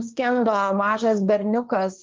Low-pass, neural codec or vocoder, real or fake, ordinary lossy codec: 7.2 kHz; codec, 16 kHz, 1.1 kbps, Voila-Tokenizer; fake; Opus, 32 kbps